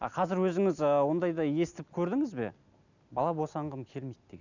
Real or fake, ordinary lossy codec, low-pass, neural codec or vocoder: real; none; 7.2 kHz; none